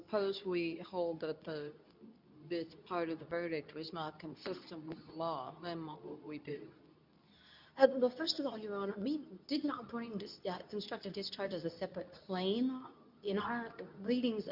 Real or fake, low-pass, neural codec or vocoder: fake; 5.4 kHz; codec, 24 kHz, 0.9 kbps, WavTokenizer, medium speech release version 2